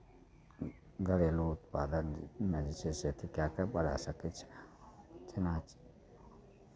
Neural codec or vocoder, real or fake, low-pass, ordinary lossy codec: none; real; none; none